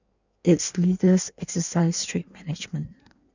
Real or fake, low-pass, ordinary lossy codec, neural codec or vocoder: fake; 7.2 kHz; none; codec, 16 kHz in and 24 kHz out, 1.1 kbps, FireRedTTS-2 codec